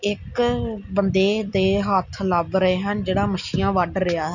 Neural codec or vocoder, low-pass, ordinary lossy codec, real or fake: none; 7.2 kHz; none; real